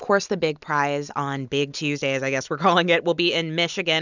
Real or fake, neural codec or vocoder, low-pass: real; none; 7.2 kHz